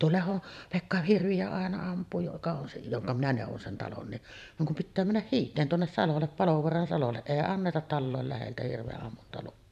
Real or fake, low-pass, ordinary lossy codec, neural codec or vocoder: fake; 14.4 kHz; none; vocoder, 44.1 kHz, 128 mel bands every 512 samples, BigVGAN v2